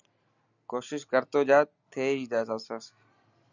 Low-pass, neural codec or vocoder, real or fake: 7.2 kHz; none; real